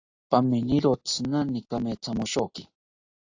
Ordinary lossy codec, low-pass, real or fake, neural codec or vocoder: AAC, 48 kbps; 7.2 kHz; real; none